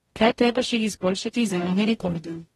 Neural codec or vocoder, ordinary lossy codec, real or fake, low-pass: codec, 44.1 kHz, 0.9 kbps, DAC; AAC, 32 kbps; fake; 19.8 kHz